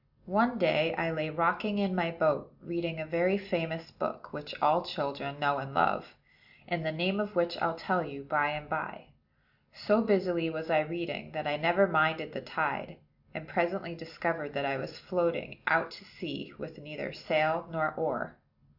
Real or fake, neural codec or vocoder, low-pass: real; none; 5.4 kHz